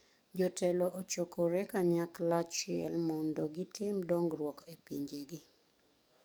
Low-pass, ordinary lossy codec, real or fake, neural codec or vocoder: none; none; fake; codec, 44.1 kHz, 7.8 kbps, DAC